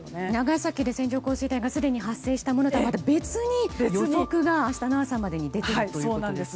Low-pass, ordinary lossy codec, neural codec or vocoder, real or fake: none; none; none; real